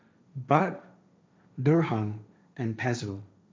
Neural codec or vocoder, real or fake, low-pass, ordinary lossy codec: codec, 16 kHz, 1.1 kbps, Voila-Tokenizer; fake; none; none